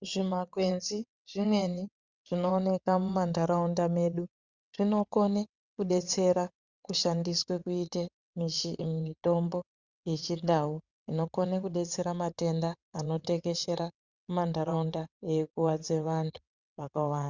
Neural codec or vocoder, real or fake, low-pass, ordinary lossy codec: vocoder, 22.05 kHz, 80 mel bands, WaveNeXt; fake; 7.2 kHz; Opus, 64 kbps